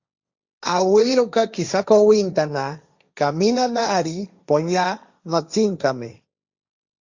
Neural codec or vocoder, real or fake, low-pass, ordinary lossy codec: codec, 16 kHz, 1.1 kbps, Voila-Tokenizer; fake; 7.2 kHz; Opus, 64 kbps